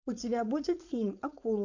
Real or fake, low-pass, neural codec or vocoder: fake; 7.2 kHz; codec, 16 kHz, 4.8 kbps, FACodec